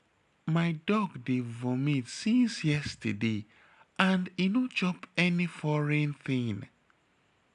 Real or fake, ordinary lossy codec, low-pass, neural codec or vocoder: real; none; 10.8 kHz; none